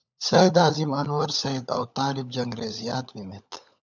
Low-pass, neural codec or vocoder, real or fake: 7.2 kHz; codec, 16 kHz, 16 kbps, FunCodec, trained on LibriTTS, 50 frames a second; fake